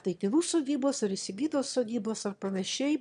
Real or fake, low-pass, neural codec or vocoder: fake; 9.9 kHz; autoencoder, 22.05 kHz, a latent of 192 numbers a frame, VITS, trained on one speaker